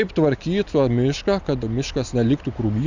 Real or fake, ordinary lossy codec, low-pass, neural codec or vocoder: real; Opus, 64 kbps; 7.2 kHz; none